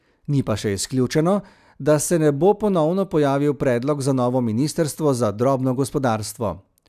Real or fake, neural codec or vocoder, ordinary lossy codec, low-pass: real; none; none; 14.4 kHz